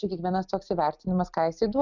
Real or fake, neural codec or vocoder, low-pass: real; none; 7.2 kHz